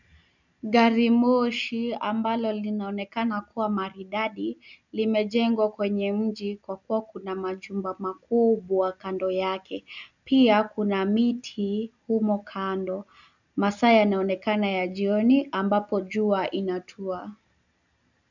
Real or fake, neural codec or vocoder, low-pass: real; none; 7.2 kHz